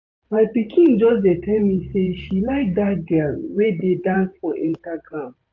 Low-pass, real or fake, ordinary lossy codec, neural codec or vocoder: 7.2 kHz; fake; AAC, 48 kbps; vocoder, 44.1 kHz, 128 mel bands every 512 samples, BigVGAN v2